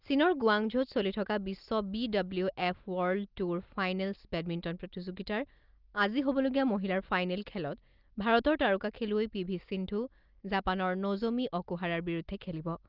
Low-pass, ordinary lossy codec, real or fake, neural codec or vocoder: 5.4 kHz; Opus, 32 kbps; real; none